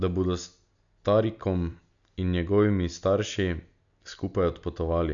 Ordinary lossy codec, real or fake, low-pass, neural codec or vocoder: none; real; 7.2 kHz; none